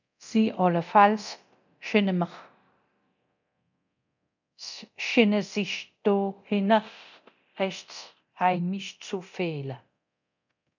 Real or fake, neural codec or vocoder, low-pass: fake; codec, 24 kHz, 0.9 kbps, DualCodec; 7.2 kHz